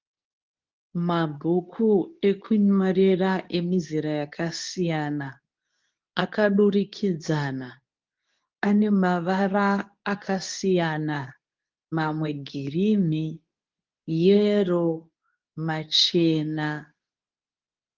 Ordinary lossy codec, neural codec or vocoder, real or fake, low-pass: Opus, 24 kbps; codec, 24 kHz, 0.9 kbps, WavTokenizer, medium speech release version 2; fake; 7.2 kHz